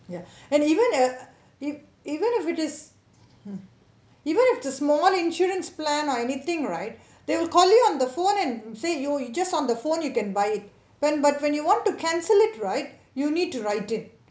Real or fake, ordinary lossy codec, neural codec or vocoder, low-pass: real; none; none; none